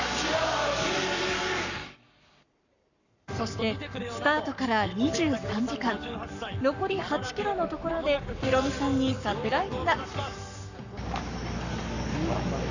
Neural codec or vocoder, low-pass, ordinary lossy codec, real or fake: codec, 44.1 kHz, 7.8 kbps, Pupu-Codec; 7.2 kHz; none; fake